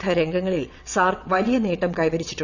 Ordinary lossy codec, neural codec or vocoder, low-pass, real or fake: none; vocoder, 22.05 kHz, 80 mel bands, WaveNeXt; 7.2 kHz; fake